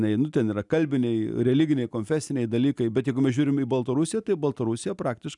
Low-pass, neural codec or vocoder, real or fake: 10.8 kHz; none; real